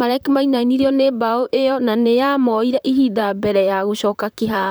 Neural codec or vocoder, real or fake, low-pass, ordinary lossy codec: vocoder, 44.1 kHz, 128 mel bands, Pupu-Vocoder; fake; none; none